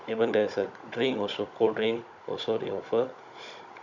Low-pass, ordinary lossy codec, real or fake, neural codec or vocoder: 7.2 kHz; none; fake; codec, 16 kHz, 16 kbps, FunCodec, trained on Chinese and English, 50 frames a second